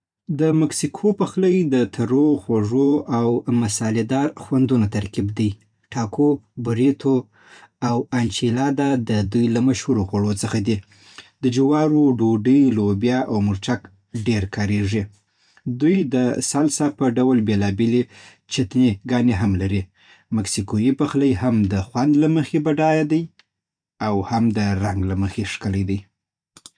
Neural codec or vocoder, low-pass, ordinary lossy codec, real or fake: vocoder, 44.1 kHz, 128 mel bands every 512 samples, BigVGAN v2; 9.9 kHz; none; fake